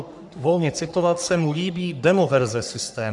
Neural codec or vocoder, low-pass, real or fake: codec, 44.1 kHz, 3.4 kbps, Pupu-Codec; 10.8 kHz; fake